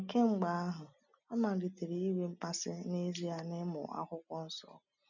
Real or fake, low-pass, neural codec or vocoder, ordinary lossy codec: real; 7.2 kHz; none; none